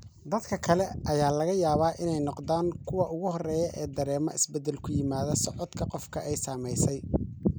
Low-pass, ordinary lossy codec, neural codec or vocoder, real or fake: none; none; none; real